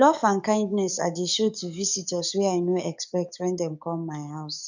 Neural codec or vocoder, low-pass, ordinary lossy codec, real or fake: codec, 44.1 kHz, 7.8 kbps, DAC; 7.2 kHz; none; fake